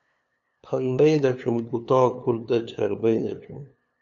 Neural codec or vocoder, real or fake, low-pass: codec, 16 kHz, 2 kbps, FunCodec, trained on LibriTTS, 25 frames a second; fake; 7.2 kHz